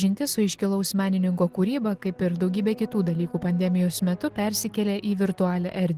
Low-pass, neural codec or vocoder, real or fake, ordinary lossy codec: 14.4 kHz; none; real; Opus, 16 kbps